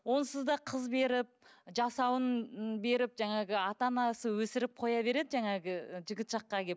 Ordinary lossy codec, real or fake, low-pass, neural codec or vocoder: none; real; none; none